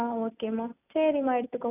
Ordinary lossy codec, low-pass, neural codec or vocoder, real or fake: none; 3.6 kHz; none; real